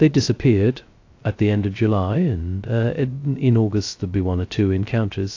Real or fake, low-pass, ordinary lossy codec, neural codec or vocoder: fake; 7.2 kHz; AAC, 48 kbps; codec, 16 kHz, 0.2 kbps, FocalCodec